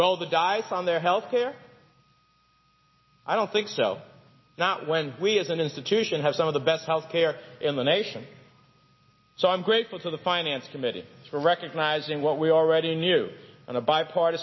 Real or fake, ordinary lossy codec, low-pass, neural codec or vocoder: real; MP3, 24 kbps; 7.2 kHz; none